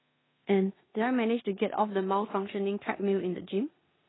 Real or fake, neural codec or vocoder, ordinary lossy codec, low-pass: fake; codec, 16 kHz in and 24 kHz out, 0.9 kbps, LongCat-Audio-Codec, four codebook decoder; AAC, 16 kbps; 7.2 kHz